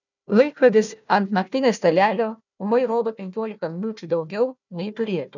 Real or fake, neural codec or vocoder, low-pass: fake; codec, 16 kHz, 1 kbps, FunCodec, trained on Chinese and English, 50 frames a second; 7.2 kHz